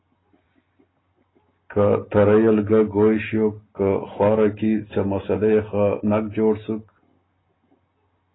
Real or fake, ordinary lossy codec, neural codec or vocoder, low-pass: real; AAC, 16 kbps; none; 7.2 kHz